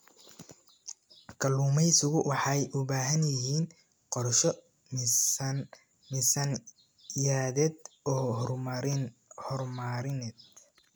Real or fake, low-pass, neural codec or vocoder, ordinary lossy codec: real; none; none; none